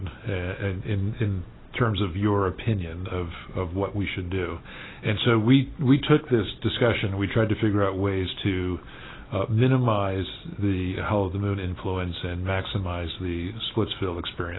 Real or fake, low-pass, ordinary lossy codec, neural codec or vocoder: real; 7.2 kHz; AAC, 16 kbps; none